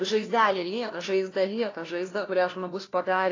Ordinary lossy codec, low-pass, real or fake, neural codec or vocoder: AAC, 32 kbps; 7.2 kHz; fake; codec, 16 kHz, 1 kbps, FunCodec, trained on LibriTTS, 50 frames a second